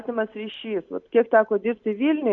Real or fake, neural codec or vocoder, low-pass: real; none; 7.2 kHz